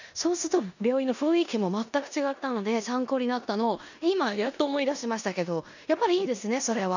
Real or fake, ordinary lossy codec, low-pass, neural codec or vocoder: fake; none; 7.2 kHz; codec, 16 kHz in and 24 kHz out, 0.9 kbps, LongCat-Audio-Codec, four codebook decoder